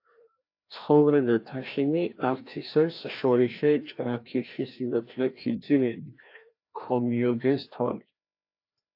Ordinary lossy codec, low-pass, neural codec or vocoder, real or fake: AAC, 32 kbps; 5.4 kHz; codec, 16 kHz, 1 kbps, FreqCodec, larger model; fake